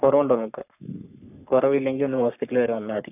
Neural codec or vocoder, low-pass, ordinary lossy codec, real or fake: codec, 44.1 kHz, 3.4 kbps, Pupu-Codec; 3.6 kHz; none; fake